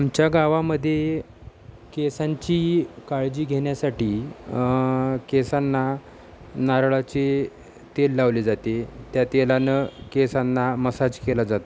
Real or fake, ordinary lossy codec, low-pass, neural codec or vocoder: real; none; none; none